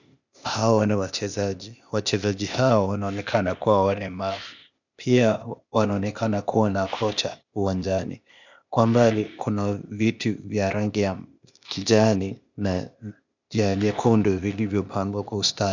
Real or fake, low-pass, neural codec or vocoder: fake; 7.2 kHz; codec, 16 kHz, 0.8 kbps, ZipCodec